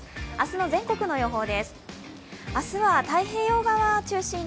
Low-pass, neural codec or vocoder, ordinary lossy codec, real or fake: none; none; none; real